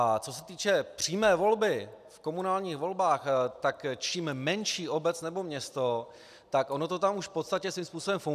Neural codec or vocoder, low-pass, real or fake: none; 14.4 kHz; real